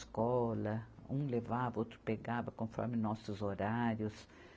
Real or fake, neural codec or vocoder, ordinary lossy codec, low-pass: real; none; none; none